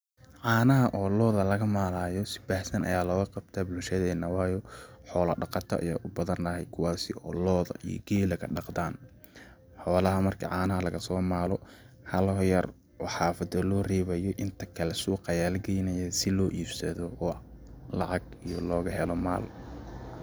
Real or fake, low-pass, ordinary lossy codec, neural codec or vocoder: real; none; none; none